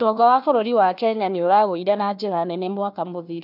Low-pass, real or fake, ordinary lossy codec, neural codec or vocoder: 5.4 kHz; fake; none; codec, 16 kHz, 1 kbps, FunCodec, trained on Chinese and English, 50 frames a second